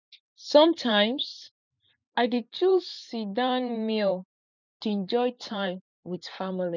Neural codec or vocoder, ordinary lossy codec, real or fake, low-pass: vocoder, 44.1 kHz, 128 mel bands every 512 samples, BigVGAN v2; none; fake; 7.2 kHz